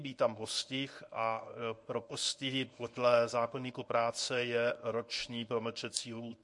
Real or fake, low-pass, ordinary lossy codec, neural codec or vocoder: fake; 10.8 kHz; MP3, 48 kbps; codec, 24 kHz, 0.9 kbps, WavTokenizer, medium speech release version 1